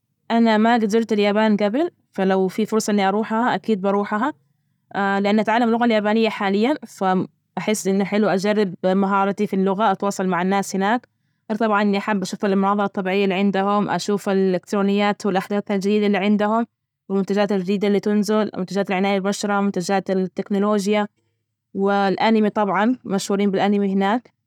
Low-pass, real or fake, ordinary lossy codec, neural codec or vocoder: 19.8 kHz; real; none; none